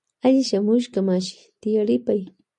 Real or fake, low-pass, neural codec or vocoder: real; 10.8 kHz; none